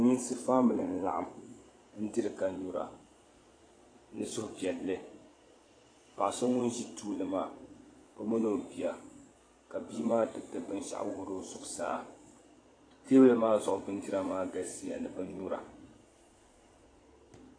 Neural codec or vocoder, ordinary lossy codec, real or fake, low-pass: vocoder, 22.05 kHz, 80 mel bands, Vocos; AAC, 32 kbps; fake; 9.9 kHz